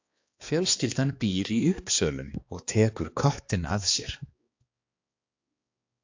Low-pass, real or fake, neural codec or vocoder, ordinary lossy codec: 7.2 kHz; fake; codec, 16 kHz, 2 kbps, X-Codec, HuBERT features, trained on balanced general audio; AAC, 48 kbps